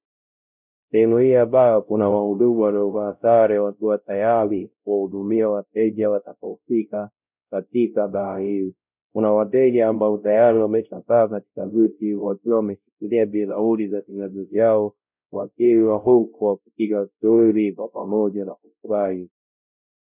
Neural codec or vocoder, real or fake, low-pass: codec, 16 kHz, 0.5 kbps, X-Codec, WavLM features, trained on Multilingual LibriSpeech; fake; 3.6 kHz